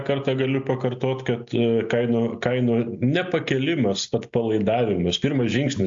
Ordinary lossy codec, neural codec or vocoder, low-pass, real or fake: AAC, 64 kbps; none; 7.2 kHz; real